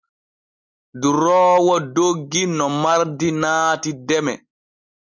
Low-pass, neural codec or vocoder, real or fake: 7.2 kHz; none; real